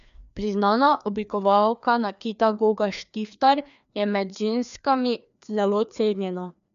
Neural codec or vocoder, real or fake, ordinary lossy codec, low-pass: codec, 16 kHz, 2 kbps, FreqCodec, larger model; fake; none; 7.2 kHz